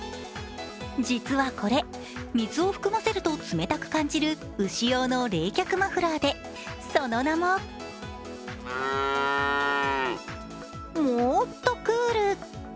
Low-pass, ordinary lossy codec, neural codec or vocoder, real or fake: none; none; none; real